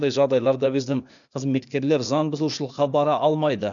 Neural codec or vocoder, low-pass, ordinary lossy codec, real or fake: codec, 16 kHz, 0.8 kbps, ZipCodec; 7.2 kHz; none; fake